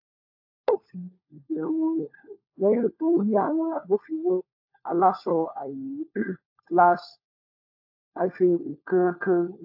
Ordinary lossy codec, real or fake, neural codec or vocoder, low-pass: AAC, 48 kbps; fake; codec, 16 kHz, 4 kbps, FunCodec, trained on LibriTTS, 50 frames a second; 5.4 kHz